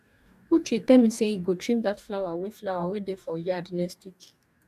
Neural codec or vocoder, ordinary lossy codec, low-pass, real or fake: codec, 44.1 kHz, 2.6 kbps, DAC; none; 14.4 kHz; fake